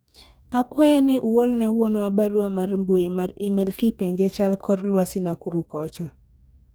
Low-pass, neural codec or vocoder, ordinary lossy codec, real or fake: none; codec, 44.1 kHz, 2.6 kbps, DAC; none; fake